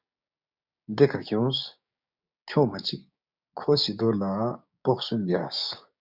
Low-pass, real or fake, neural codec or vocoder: 5.4 kHz; fake; codec, 16 kHz, 6 kbps, DAC